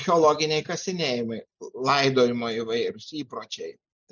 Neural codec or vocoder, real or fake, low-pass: none; real; 7.2 kHz